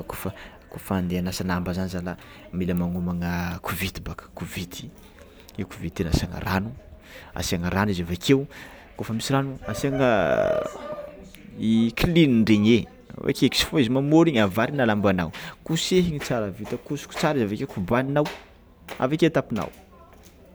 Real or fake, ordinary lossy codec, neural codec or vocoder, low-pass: real; none; none; none